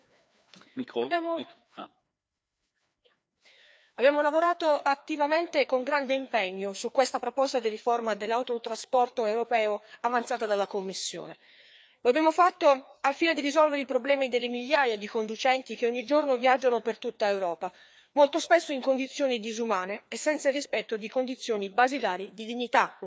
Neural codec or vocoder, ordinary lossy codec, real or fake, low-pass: codec, 16 kHz, 2 kbps, FreqCodec, larger model; none; fake; none